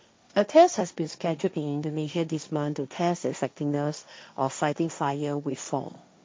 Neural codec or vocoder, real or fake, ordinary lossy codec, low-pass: codec, 16 kHz, 1.1 kbps, Voila-Tokenizer; fake; none; none